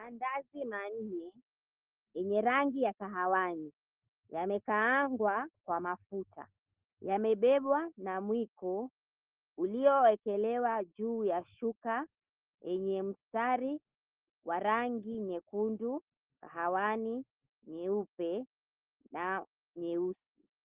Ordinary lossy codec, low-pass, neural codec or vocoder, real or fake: Opus, 16 kbps; 3.6 kHz; none; real